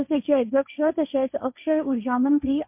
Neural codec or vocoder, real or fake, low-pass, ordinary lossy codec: codec, 16 kHz, 1.1 kbps, Voila-Tokenizer; fake; 3.6 kHz; MP3, 32 kbps